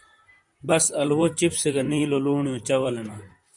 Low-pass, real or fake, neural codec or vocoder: 10.8 kHz; fake; vocoder, 44.1 kHz, 128 mel bands, Pupu-Vocoder